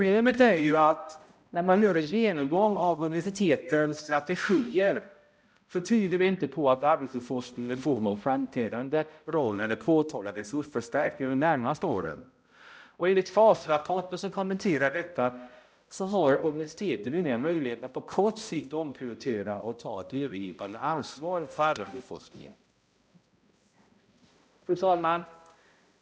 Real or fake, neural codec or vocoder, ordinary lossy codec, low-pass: fake; codec, 16 kHz, 0.5 kbps, X-Codec, HuBERT features, trained on balanced general audio; none; none